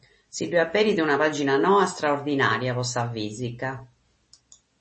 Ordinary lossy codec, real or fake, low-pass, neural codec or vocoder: MP3, 32 kbps; real; 10.8 kHz; none